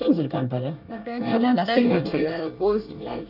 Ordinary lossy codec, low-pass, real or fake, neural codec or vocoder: none; 5.4 kHz; fake; codec, 24 kHz, 1 kbps, SNAC